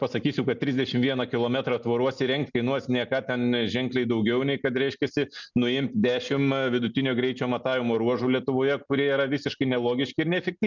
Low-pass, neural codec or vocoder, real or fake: 7.2 kHz; none; real